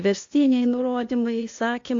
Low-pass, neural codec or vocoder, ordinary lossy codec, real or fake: 7.2 kHz; codec, 16 kHz, 0.8 kbps, ZipCodec; AAC, 48 kbps; fake